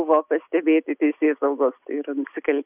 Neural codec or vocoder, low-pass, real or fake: none; 3.6 kHz; real